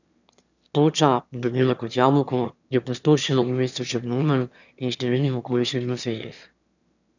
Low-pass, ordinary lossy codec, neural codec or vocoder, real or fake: 7.2 kHz; none; autoencoder, 22.05 kHz, a latent of 192 numbers a frame, VITS, trained on one speaker; fake